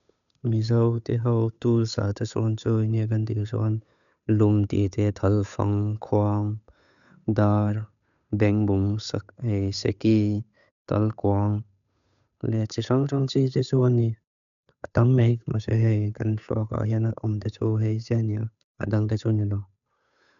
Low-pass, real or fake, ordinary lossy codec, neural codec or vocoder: 7.2 kHz; fake; none; codec, 16 kHz, 8 kbps, FunCodec, trained on Chinese and English, 25 frames a second